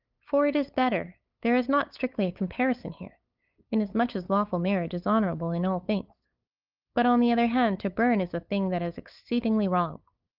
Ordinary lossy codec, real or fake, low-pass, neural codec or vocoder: Opus, 24 kbps; fake; 5.4 kHz; codec, 16 kHz, 8 kbps, FunCodec, trained on LibriTTS, 25 frames a second